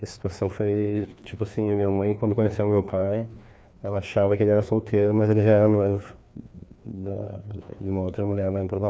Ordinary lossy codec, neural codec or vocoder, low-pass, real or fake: none; codec, 16 kHz, 2 kbps, FreqCodec, larger model; none; fake